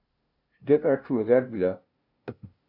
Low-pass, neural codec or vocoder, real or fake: 5.4 kHz; codec, 16 kHz, 0.5 kbps, FunCodec, trained on LibriTTS, 25 frames a second; fake